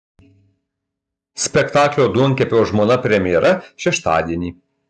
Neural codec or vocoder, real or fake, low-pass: none; real; 10.8 kHz